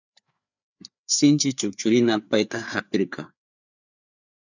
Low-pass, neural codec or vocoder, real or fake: 7.2 kHz; codec, 16 kHz, 4 kbps, FreqCodec, larger model; fake